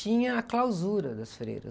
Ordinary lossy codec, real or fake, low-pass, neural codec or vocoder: none; real; none; none